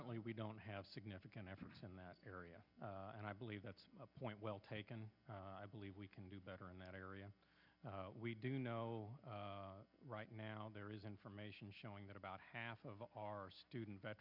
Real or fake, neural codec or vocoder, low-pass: real; none; 5.4 kHz